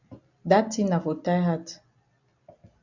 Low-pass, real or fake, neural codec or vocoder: 7.2 kHz; real; none